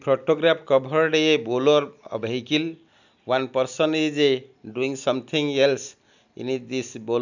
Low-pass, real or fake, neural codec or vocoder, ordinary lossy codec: 7.2 kHz; real; none; none